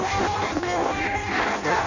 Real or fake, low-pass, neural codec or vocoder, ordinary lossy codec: fake; 7.2 kHz; codec, 16 kHz in and 24 kHz out, 0.6 kbps, FireRedTTS-2 codec; none